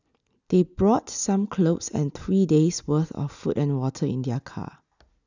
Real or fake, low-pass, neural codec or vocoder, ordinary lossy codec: real; 7.2 kHz; none; none